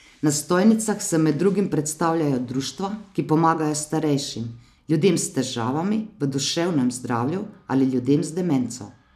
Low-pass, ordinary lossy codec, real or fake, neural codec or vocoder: 14.4 kHz; none; real; none